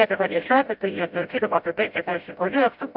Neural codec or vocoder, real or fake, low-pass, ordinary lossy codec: codec, 16 kHz, 0.5 kbps, FreqCodec, smaller model; fake; 5.4 kHz; MP3, 48 kbps